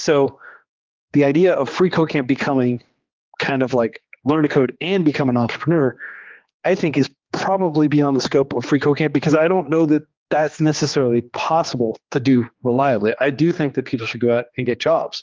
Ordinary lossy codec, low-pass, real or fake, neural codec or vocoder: Opus, 32 kbps; 7.2 kHz; fake; codec, 16 kHz, 2 kbps, X-Codec, HuBERT features, trained on general audio